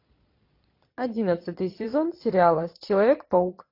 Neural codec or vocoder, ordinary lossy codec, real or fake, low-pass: vocoder, 22.05 kHz, 80 mel bands, Vocos; AAC, 32 kbps; fake; 5.4 kHz